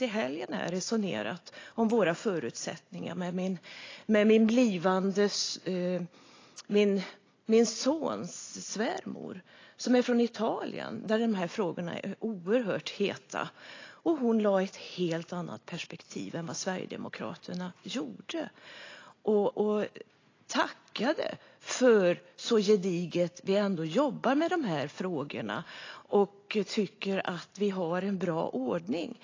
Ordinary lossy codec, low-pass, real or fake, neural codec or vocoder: AAC, 32 kbps; 7.2 kHz; real; none